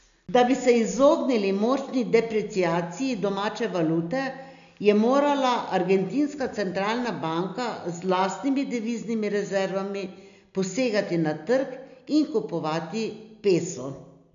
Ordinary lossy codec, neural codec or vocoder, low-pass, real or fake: AAC, 96 kbps; none; 7.2 kHz; real